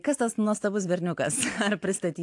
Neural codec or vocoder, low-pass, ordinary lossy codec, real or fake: none; 10.8 kHz; AAC, 64 kbps; real